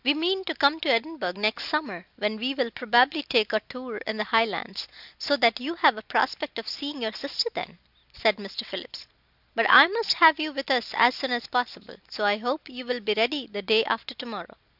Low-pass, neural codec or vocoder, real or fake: 5.4 kHz; none; real